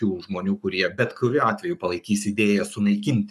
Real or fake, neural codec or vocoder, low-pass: fake; codec, 44.1 kHz, 7.8 kbps, Pupu-Codec; 14.4 kHz